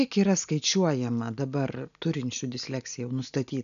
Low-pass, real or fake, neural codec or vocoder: 7.2 kHz; real; none